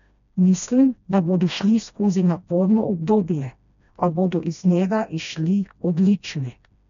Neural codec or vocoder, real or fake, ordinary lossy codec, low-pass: codec, 16 kHz, 1 kbps, FreqCodec, smaller model; fake; none; 7.2 kHz